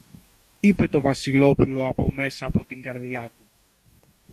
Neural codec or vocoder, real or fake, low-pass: codec, 44.1 kHz, 2.6 kbps, DAC; fake; 14.4 kHz